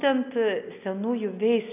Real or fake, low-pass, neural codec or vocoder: real; 3.6 kHz; none